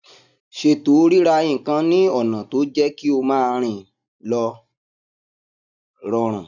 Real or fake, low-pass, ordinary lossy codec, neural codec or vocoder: real; 7.2 kHz; none; none